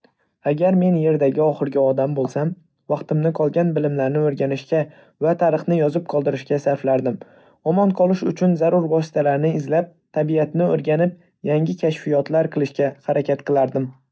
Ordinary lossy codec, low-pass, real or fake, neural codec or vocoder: none; none; real; none